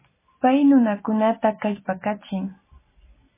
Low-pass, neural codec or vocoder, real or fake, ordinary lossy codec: 3.6 kHz; none; real; MP3, 16 kbps